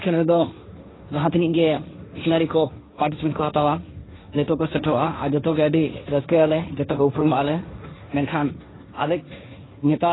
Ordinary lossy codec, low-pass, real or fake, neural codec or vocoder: AAC, 16 kbps; 7.2 kHz; fake; codec, 16 kHz, 1.1 kbps, Voila-Tokenizer